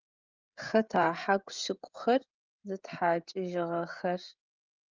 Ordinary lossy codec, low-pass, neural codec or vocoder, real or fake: Opus, 32 kbps; 7.2 kHz; codec, 16 kHz, 16 kbps, FreqCodec, smaller model; fake